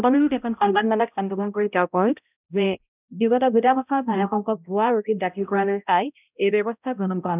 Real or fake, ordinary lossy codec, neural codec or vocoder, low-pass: fake; none; codec, 16 kHz, 0.5 kbps, X-Codec, HuBERT features, trained on balanced general audio; 3.6 kHz